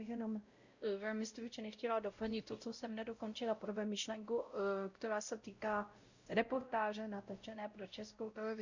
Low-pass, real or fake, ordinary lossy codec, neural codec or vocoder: 7.2 kHz; fake; Opus, 64 kbps; codec, 16 kHz, 0.5 kbps, X-Codec, WavLM features, trained on Multilingual LibriSpeech